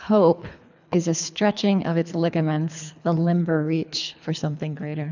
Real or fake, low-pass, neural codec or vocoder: fake; 7.2 kHz; codec, 24 kHz, 3 kbps, HILCodec